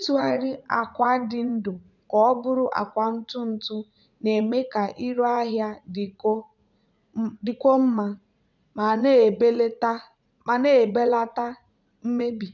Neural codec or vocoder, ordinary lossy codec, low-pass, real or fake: vocoder, 44.1 kHz, 80 mel bands, Vocos; none; 7.2 kHz; fake